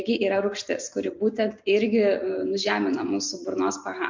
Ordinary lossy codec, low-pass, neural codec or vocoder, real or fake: MP3, 48 kbps; 7.2 kHz; none; real